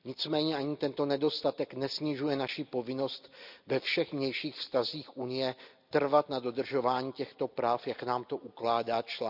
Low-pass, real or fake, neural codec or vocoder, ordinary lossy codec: 5.4 kHz; real; none; none